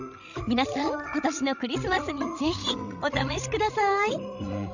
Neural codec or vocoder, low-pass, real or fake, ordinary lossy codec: codec, 16 kHz, 16 kbps, FreqCodec, larger model; 7.2 kHz; fake; none